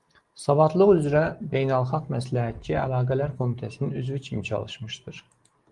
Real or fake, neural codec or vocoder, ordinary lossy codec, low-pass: real; none; Opus, 24 kbps; 10.8 kHz